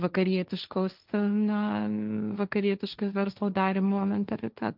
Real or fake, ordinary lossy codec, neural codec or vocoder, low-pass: fake; Opus, 24 kbps; codec, 16 kHz, 1.1 kbps, Voila-Tokenizer; 5.4 kHz